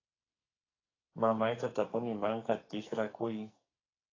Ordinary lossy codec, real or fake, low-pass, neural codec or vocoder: AAC, 32 kbps; fake; 7.2 kHz; codec, 44.1 kHz, 2.6 kbps, SNAC